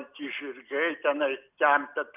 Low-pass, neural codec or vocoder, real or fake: 3.6 kHz; none; real